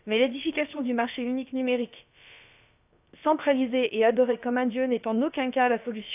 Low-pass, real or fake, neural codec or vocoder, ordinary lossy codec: 3.6 kHz; fake; codec, 16 kHz, about 1 kbps, DyCAST, with the encoder's durations; none